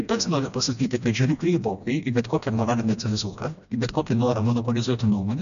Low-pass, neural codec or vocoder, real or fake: 7.2 kHz; codec, 16 kHz, 1 kbps, FreqCodec, smaller model; fake